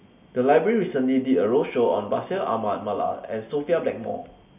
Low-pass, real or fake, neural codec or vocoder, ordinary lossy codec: 3.6 kHz; real; none; none